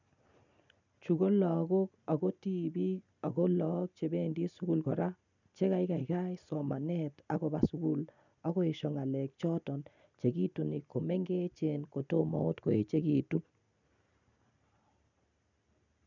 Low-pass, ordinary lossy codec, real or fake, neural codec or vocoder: 7.2 kHz; none; real; none